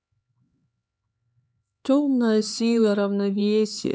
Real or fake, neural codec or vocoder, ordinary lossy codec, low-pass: fake; codec, 16 kHz, 4 kbps, X-Codec, HuBERT features, trained on LibriSpeech; none; none